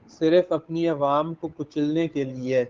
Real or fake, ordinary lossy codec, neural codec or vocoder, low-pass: fake; Opus, 32 kbps; codec, 16 kHz, 8 kbps, FunCodec, trained on Chinese and English, 25 frames a second; 7.2 kHz